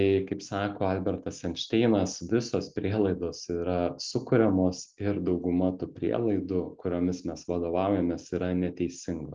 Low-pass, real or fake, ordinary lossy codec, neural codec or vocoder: 7.2 kHz; real; Opus, 32 kbps; none